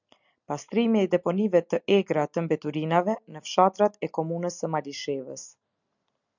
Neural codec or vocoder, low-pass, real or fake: none; 7.2 kHz; real